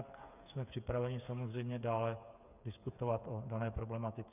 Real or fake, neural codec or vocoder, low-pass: fake; codec, 16 kHz, 8 kbps, FreqCodec, smaller model; 3.6 kHz